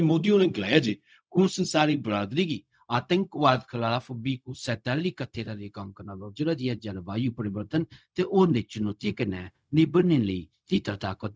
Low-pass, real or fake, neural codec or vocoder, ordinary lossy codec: none; fake; codec, 16 kHz, 0.4 kbps, LongCat-Audio-Codec; none